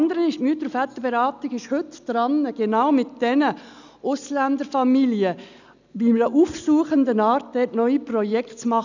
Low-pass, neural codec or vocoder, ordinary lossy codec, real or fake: 7.2 kHz; none; none; real